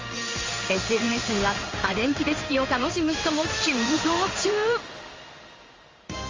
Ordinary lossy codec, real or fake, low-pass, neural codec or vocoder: Opus, 32 kbps; fake; 7.2 kHz; codec, 16 kHz in and 24 kHz out, 1 kbps, XY-Tokenizer